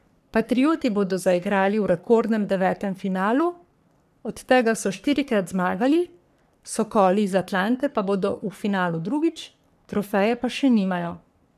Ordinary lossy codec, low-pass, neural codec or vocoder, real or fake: none; 14.4 kHz; codec, 44.1 kHz, 3.4 kbps, Pupu-Codec; fake